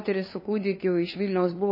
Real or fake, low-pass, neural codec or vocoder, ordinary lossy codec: fake; 5.4 kHz; codec, 16 kHz, 2 kbps, FunCodec, trained on LibriTTS, 25 frames a second; MP3, 24 kbps